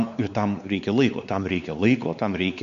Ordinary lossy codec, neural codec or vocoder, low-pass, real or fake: MP3, 64 kbps; codec, 16 kHz, 4 kbps, X-Codec, WavLM features, trained on Multilingual LibriSpeech; 7.2 kHz; fake